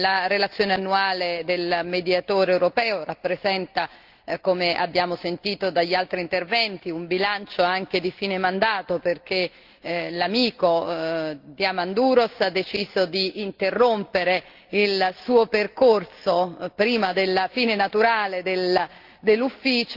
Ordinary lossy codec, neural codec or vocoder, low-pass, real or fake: Opus, 32 kbps; none; 5.4 kHz; real